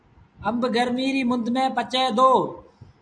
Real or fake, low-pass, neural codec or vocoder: real; 9.9 kHz; none